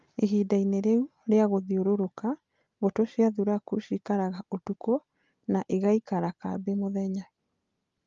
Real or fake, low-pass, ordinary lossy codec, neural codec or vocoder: real; 7.2 kHz; Opus, 32 kbps; none